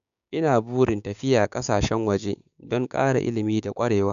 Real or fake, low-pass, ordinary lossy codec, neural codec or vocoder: fake; 7.2 kHz; none; codec, 16 kHz, 6 kbps, DAC